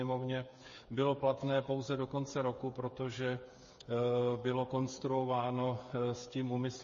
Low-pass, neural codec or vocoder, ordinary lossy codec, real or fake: 7.2 kHz; codec, 16 kHz, 8 kbps, FreqCodec, smaller model; MP3, 32 kbps; fake